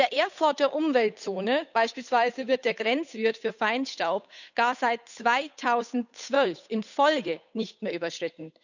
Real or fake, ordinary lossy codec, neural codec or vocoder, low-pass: fake; none; codec, 16 kHz, 8 kbps, FunCodec, trained on Chinese and English, 25 frames a second; 7.2 kHz